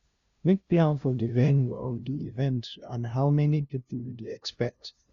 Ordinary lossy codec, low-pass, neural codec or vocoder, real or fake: Opus, 64 kbps; 7.2 kHz; codec, 16 kHz, 0.5 kbps, FunCodec, trained on LibriTTS, 25 frames a second; fake